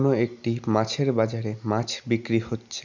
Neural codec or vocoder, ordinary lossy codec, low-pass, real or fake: none; none; 7.2 kHz; real